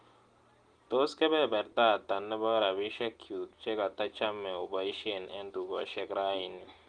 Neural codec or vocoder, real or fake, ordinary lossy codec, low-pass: none; real; Opus, 24 kbps; 9.9 kHz